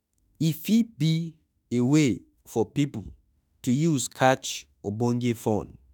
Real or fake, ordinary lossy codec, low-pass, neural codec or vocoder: fake; none; 19.8 kHz; autoencoder, 48 kHz, 32 numbers a frame, DAC-VAE, trained on Japanese speech